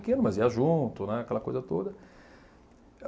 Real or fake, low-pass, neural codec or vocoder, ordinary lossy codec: real; none; none; none